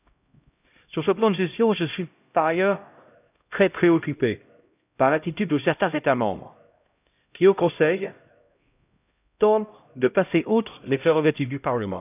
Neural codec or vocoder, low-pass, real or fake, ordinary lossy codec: codec, 16 kHz, 0.5 kbps, X-Codec, HuBERT features, trained on LibriSpeech; 3.6 kHz; fake; none